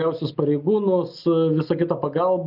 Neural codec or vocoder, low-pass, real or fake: none; 5.4 kHz; real